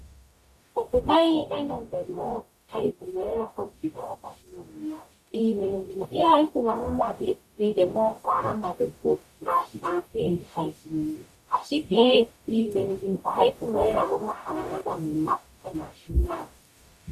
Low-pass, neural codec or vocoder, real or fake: 14.4 kHz; codec, 44.1 kHz, 0.9 kbps, DAC; fake